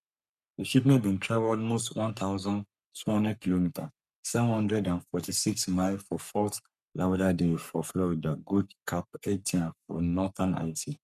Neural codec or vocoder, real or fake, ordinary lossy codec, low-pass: codec, 44.1 kHz, 3.4 kbps, Pupu-Codec; fake; none; 14.4 kHz